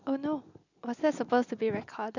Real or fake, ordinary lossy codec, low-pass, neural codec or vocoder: real; none; 7.2 kHz; none